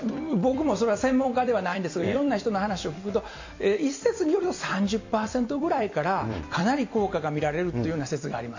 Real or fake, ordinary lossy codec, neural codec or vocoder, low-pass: fake; AAC, 48 kbps; vocoder, 44.1 kHz, 128 mel bands every 256 samples, BigVGAN v2; 7.2 kHz